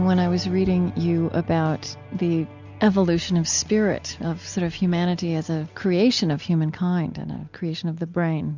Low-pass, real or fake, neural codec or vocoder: 7.2 kHz; real; none